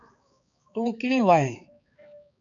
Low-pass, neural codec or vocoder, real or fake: 7.2 kHz; codec, 16 kHz, 4 kbps, X-Codec, HuBERT features, trained on balanced general audio; fake